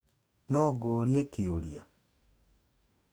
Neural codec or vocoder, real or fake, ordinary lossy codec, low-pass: codec, 44.1 kHz, 2.6 kbps, DAC; fake; none; none